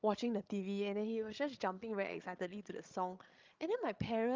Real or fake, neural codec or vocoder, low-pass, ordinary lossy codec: fake; codec, 16 kHz, 16 kbps, FreqCodec, larger model; 7.2 kHz; Opus, 24 kbps